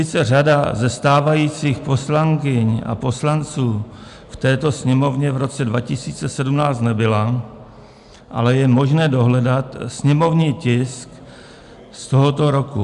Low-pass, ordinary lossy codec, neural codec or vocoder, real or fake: 10.8 kHz; MP3, 96 kbps; none; real